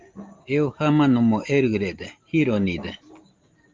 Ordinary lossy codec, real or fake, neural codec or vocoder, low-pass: Opus, 32 kbps; real; none; 7.2 kHz